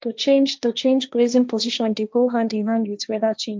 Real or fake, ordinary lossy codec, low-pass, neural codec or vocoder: fake; none; none; codec, 16 kHz, 1.1 kbps, Voila-Tokenizer